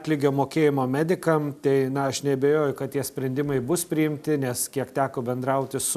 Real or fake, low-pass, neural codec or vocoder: real; 14.4 kHz; none